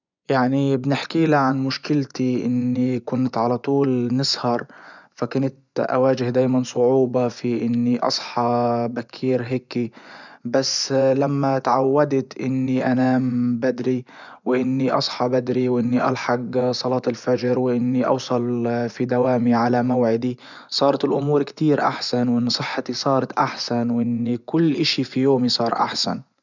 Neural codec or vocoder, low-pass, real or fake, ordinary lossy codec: vocoder, 24 kHz, 100 mel bands, Vocos; 7.2 kHz; fake; none